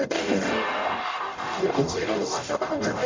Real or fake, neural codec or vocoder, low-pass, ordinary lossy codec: fake; codec, 44.1 kHz, 0.9 kbps, DAC; 7.2 kHz; MP3, 64 kbps